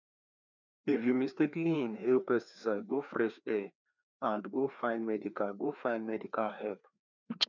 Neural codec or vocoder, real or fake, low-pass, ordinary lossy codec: codec, 16 kHz, 2 kbps, FreqCodec, larger model; fake; 7.2 kHz; none